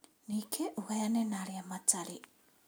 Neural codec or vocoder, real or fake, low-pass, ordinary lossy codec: none; real; none; none